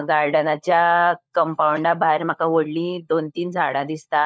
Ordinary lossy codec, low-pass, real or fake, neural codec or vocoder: none; none; fake; codec, 16 kHz, 16 kbps, FunCodec, trained on LibriTTS, 50 frames a second